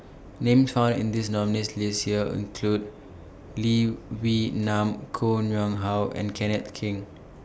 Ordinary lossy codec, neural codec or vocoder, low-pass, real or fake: none; none; none; real